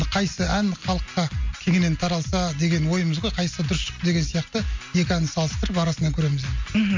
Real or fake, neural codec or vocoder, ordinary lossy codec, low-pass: real; none; MP3, 48 kbps; 7.2 kHz